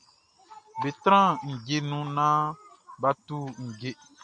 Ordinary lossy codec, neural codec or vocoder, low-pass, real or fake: MP3, 96 kbps; none; 9.9 kHz; real